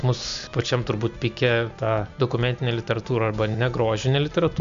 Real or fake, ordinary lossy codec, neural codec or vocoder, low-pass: real; MP3, 64 kbps; none; 7.2 kHz